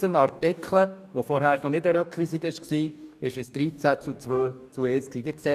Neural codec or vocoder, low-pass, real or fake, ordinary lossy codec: codec, 44.1 kHz, 2.6 kbps, DAC; 14.4 kHz; fake; none